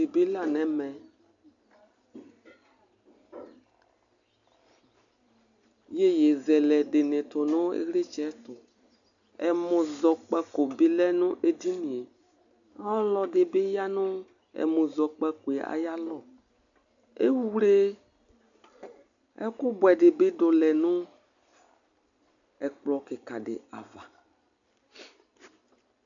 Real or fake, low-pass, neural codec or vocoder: real; 7.2 kHz; none